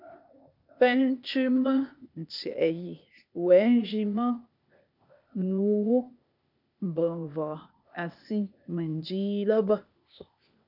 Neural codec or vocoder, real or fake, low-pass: codec, 16 kHz, 0.8 kbps, ZipCodec; fake; 5.4 kHz